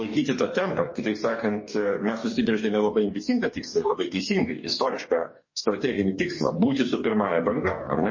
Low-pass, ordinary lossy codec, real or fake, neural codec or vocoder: 7.2 kHz; MP3, 32 kbps; fake; codec, 44.1 kHz, 2.6 kbps, DAC